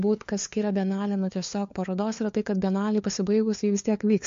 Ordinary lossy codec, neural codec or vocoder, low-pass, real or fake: MP3, 48 kbps; codec, 16 kHz, 4 kbps, FunCodec, trained on LibriTTS, 50 frames a second; 7.2 kHz; fake